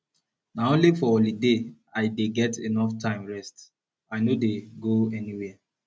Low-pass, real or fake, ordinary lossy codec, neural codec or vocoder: none; real; none; none